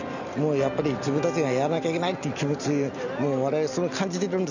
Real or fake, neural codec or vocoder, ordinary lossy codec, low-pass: real; none; none; 7.2 kHz